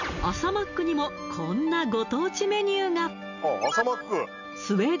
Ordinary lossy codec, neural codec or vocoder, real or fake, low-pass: none; none; real; 7.2 kHz